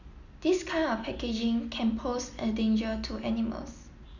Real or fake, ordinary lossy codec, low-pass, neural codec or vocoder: real; none; 7.2 kHz; none